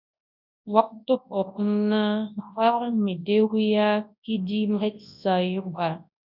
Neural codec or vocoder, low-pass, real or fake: codec, 24 kHz, 0.9 kbps, WavTokenizer, large speech release; 5.4 kHz; fake